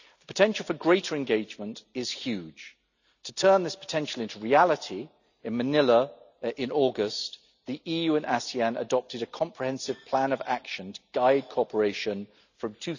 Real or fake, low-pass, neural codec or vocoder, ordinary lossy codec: real; 7.2 kHz; none; none